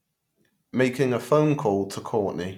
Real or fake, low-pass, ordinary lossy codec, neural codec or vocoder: real; 19.8 kHz; none; none